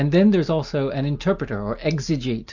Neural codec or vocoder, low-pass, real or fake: none; 7.2 kHz; real